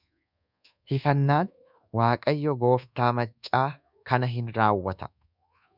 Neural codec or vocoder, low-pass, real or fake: codec, 24 kHz, 1.2 kbps, DualCodec; 5.4 kHz; fake